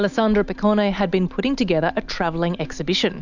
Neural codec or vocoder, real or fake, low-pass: none; real; 7.2 kHz